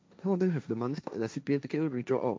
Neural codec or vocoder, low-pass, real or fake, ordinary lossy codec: codec, 16 kHz, 1.1 kbps, Voila-Tokenizer; none; fake; none